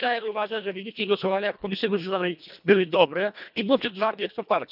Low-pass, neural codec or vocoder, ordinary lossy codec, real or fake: 5.4 kHz; codec, 24 kHz, 1.5 kbps, HILCodec; none; fake